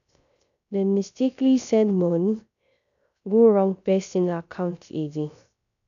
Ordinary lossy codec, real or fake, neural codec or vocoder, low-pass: none; fake; codec, 16 kHz, 0.3 kbps, FocalCodec; 7.2 kHz